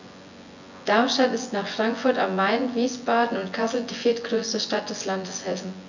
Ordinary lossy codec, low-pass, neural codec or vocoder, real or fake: none; 7.2 kHz; vocoder, 24 kHz, 100 mel bands, Vocos; fake